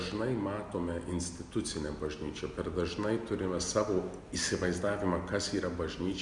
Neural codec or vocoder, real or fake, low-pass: none; real; 10.8 kHz